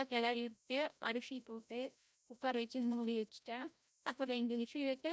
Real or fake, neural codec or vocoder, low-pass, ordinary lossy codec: fake; codec, 16 kHz, 0.5 kbps, FreqCodec, larger model; none; none